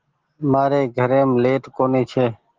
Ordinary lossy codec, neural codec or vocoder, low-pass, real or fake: Opus, 16 kbps; none; 7.2 kHz; real